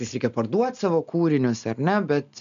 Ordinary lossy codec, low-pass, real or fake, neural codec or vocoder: MP3, 64 kbps; 7.2 kHz; real; none